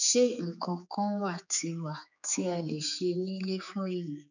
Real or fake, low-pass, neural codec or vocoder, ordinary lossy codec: fake; 7.2 kHz; codec, 16 kHz, 4 kbps, X-Codec, HuBERT features, trained on general audio; none